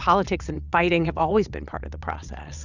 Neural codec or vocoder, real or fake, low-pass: vocoder, 22.05 kHz, 80 mel bands, WaveNeXt; fake; 7.2 kHz